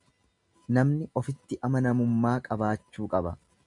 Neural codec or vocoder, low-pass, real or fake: none; 10.8 kHz; real